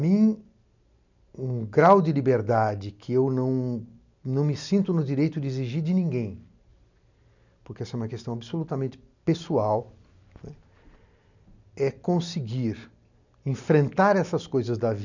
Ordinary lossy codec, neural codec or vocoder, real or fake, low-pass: none; none; real; 7.2 kHz